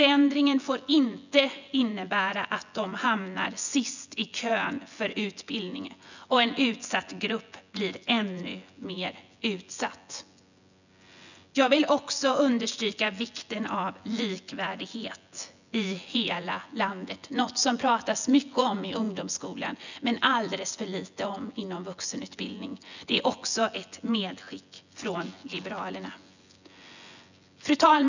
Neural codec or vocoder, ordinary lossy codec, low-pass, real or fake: vocoder, 24 kHz, 100 mel bands, Vocos; none; 7.2 kHz; fake